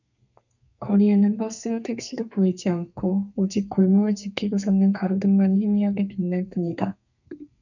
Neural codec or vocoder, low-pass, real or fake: codec, 32 kHz, 1.9 kbps, SNAC; 7.2 kHz; fake